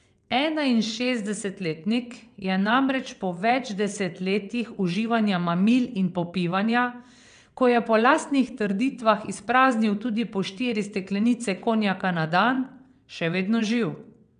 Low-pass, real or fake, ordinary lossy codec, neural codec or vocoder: 9.9 kHz; fake; none; vocoder, 22.05 kHz, 80 mel bands, WaveNeXt